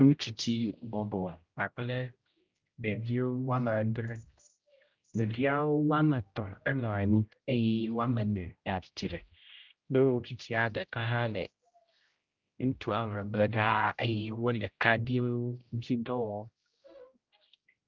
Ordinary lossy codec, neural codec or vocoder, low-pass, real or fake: Opus, 24 kbps; codec, 16 kHz, 0.5 kbps, X-Codec, HuBERT features, trained on general audio; 7.2 kHz; fake